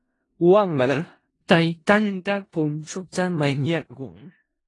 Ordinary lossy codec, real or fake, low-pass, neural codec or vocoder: AAC, 32 kbps; fake; 10.8 kHz; codec, 16 kHz in and 24 kHz out, 0.4 kbps, LongCat-Audio-Codec, four codebook decoder